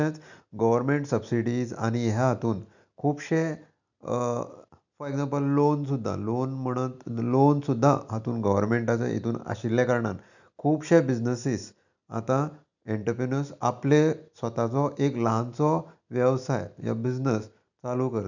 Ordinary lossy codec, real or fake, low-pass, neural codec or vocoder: none; real; 7.2 kHz; none